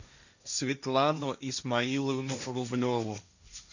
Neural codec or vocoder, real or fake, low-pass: codec, 16 kHz, 1.1 kbps, Voila-Tokenizer; fake; 7.2 kHz